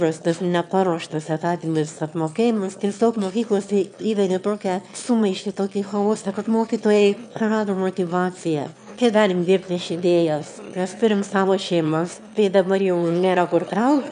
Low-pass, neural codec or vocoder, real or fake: 9.9 kHz; autoencoder, 22.05 kHz, a latent of 192 numbers a frame, VITS, trained on one speaker; fake